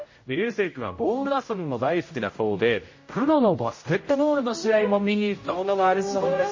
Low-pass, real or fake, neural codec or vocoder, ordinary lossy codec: 7.2 kHz; fake; codec, 16 kHz, 0.5 kbps, X-Codec, HuBERT features, trained on general audio; MP3, 32 kbps